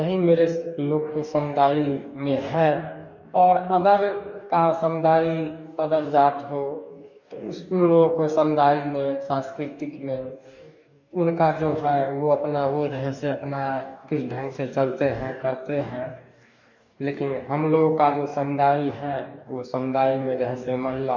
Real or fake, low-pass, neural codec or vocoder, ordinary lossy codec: fake; 7.2 kHz; codec, 44.1 kHz, 2.6 kbps, DAC; none